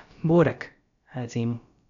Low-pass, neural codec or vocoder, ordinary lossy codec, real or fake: 7.2 kHz; codec, 16 kHz, about 1 kbps, DyCAST, with the encoder's durations; none; fake